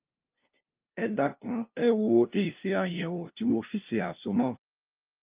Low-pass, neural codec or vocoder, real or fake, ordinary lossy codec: 3.6 kHz; codec, 16 kHz, 0.5 kbps, FunCodec, trained on LibriTTS, 25 frames a second; fake; Opus, 24 kbps